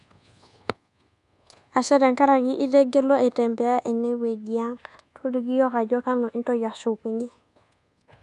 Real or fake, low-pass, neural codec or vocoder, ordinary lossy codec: fake; 10.8 kHz; codec, 24 kHz, 1.2 kbps, DualCodec; none